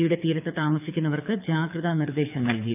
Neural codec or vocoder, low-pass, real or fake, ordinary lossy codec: codec, 24 kHz, 6 kbps, HILCodec; 3.6 kHz; fake; none